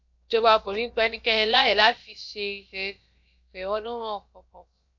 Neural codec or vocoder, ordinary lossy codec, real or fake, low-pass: codec, 16 kHz, about 1 kbps, DyCAST, with the encoder's durations; MP3, 64 kbps; fake; 7.2 kHz